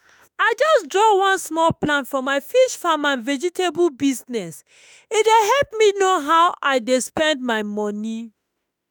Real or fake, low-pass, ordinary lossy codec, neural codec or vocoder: fake; none; none; autoencoder, 48 kHz, 32 numbers a frame, DAC-VAE, trained on Japanese speech